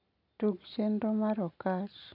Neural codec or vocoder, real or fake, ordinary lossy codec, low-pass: none; real; none; 5.4 kHz